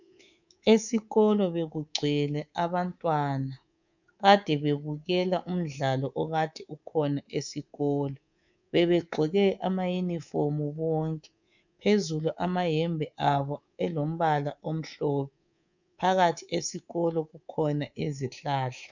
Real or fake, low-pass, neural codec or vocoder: fake; 7.2 kHz; codec, 24 kHz, 3.1 kbps, DualCodec